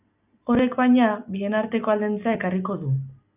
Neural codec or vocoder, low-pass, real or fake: none; 3.6 kHz; real